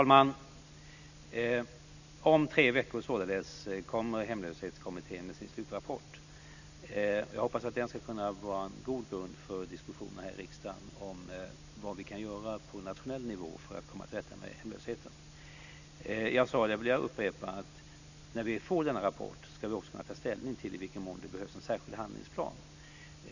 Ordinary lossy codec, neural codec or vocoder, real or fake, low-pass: none; none; real; 7.2 kHz